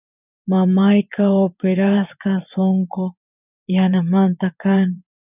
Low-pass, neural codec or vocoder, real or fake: 3.6 kHz; vocoder, 24 kHz, 100 mel bands, Vocos; fake